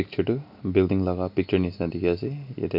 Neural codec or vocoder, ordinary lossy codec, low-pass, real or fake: none; none; 5.4 kHz; real